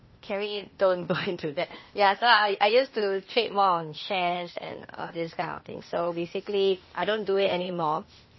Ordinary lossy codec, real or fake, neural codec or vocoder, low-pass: MP3, 24 kbps; fake; codec, 16 kHz, 0.8 kbps, ZipCodec; 7.2 kHz